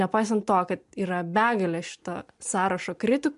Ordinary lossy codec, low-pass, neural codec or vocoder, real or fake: MP3, 64 kbps; 10.8 kHz; none; real